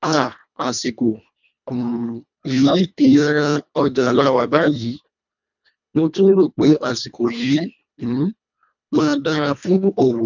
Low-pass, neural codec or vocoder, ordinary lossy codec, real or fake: 7.2 kHz; codec, 24 kHz, 1.5 kbps, HILCodec; none; fake